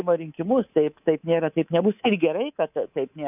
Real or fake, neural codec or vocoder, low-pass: fake; codec, 24 kHz, 3.1 kbps, DualCodec; 3.6 kHz